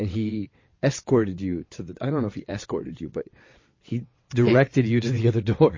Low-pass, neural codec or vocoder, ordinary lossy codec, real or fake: 7.2 kHz; vocoder, 22.05 kHz, 80 mel bands, WaveNeXt; MP3, 32 kbps; fake